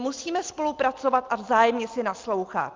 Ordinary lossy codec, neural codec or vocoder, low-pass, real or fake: Opus, 24 kbps; none; 7.2 kHz; real